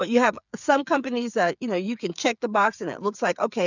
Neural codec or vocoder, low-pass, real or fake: codec, 16 kHz, 8 kbps, FreqCodec, smaller model; 7.2 kHz; fake